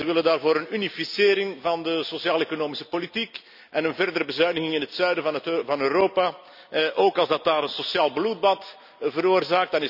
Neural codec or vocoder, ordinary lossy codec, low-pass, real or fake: none; none; 5.4 kHz; real